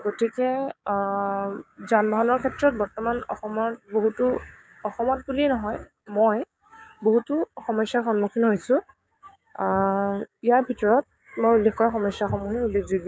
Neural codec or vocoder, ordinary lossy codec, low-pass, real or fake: codec, 16 kHz, 6 kbps, DAC; none; none; fake